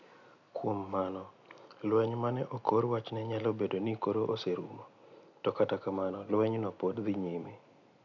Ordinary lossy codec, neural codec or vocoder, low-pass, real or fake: none; none; 7.2 kHz; real